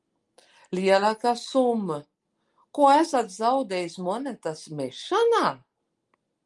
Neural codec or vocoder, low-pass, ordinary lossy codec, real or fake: none; 10.8 kHz; Opus, 32 kbps; real